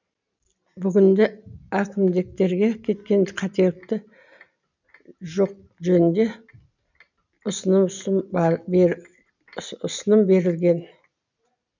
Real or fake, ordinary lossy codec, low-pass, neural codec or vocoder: real; none; 7.2 kHz; none